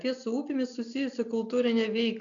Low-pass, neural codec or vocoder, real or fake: 7.2 kHz; none; real